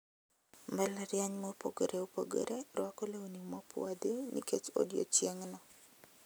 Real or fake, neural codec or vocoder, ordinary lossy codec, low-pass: real; none; none; none